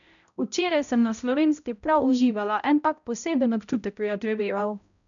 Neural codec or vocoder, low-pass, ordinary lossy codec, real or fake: codec, 16 kHz, 0.5 kbps, X-Codec, HuBERT features, trained on balanced general audio; 7.2 kHz; none; fake